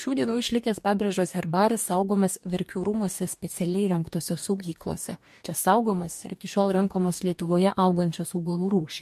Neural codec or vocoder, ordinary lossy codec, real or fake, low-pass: codec, 44.1 kHz, 2.6 kbps, DAC; MP3, 64 kbps; fake; 14.4 kHz